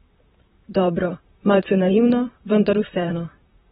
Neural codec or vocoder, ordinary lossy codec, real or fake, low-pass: vocoder, 44.1 kHz, 128 mel bands, Pupu-Vocoder; AAC, 16 kbps; fake; 19.8 kHz